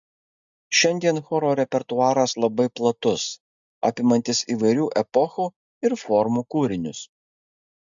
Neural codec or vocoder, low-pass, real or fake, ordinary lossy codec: none; 7.2 kHz; real; MP3, 64 kbps